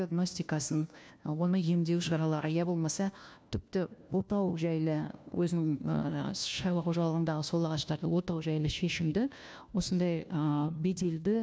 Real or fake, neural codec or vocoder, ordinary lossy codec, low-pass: fake; codec, 16 kHz, 1 kbps, FunCodec, trained on LibriTTS, 50 frames a second; none; none